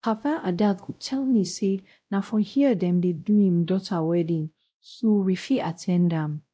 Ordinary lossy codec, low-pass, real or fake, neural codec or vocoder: none; none; fake; codec, 16 kHz, 0.5 kbps, X-Codec, WavLM features, trained on Multilingual LibriSpeech